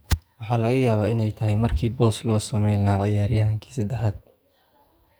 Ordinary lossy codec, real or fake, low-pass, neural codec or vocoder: none; fake; none; codec, 44.1 kHz, 2.6 kbps, SNAC